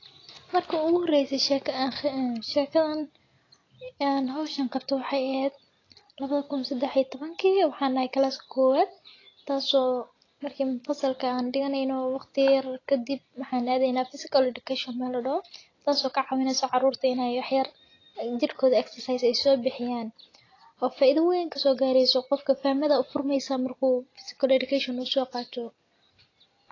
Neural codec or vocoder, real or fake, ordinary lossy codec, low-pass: none; real; AAC, 32 kbps; 7.2 kHz